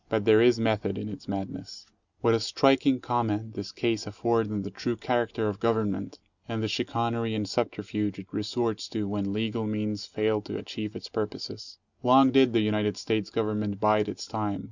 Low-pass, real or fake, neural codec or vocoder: 7.2 kHz; real; none